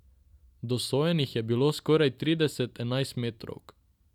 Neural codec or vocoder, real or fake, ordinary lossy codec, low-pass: none; real; none; 19.8 kHz